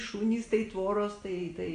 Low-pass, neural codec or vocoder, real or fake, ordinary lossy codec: 9.9 kHz; none; real; AAC, 48 kbps